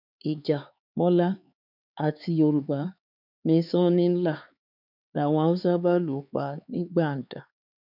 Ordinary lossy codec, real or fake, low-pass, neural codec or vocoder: none; fake; 5.4 kHz; codec, 16 kHz, 4 kbps, X-Codec, HuBERT features, trained on LibriSpeech